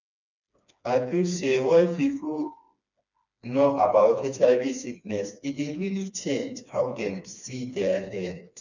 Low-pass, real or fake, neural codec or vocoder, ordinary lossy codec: 7.2 kHz; fake; codec, 16 kHz, 2 kbps, FreqCodec, smaller model; none